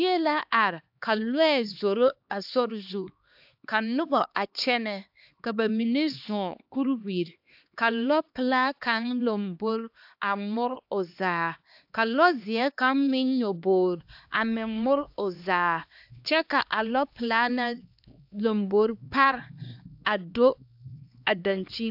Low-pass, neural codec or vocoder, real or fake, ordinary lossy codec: 5.4 kHz; codec, 16 kHz, 2 kbps, X-Codec, HuBERT features, trained on LibriSpeech; fake; AAC, 48 kbps